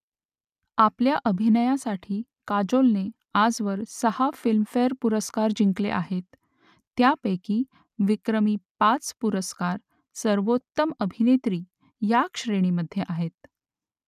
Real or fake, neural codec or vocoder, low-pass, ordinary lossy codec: real; none; 14.4 kHz; none